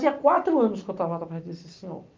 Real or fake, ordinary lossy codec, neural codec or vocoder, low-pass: real; Opus, 24 kbps; none; 7.2 kHz